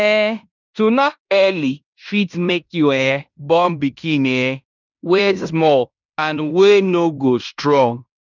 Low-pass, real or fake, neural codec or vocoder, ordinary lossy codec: 7.2 kHz; fake; codec, 16 kHz in and 24 kHz out, 0.9 kbps, LongCat-Audio-Codec, fine tuned four codebook decoder; none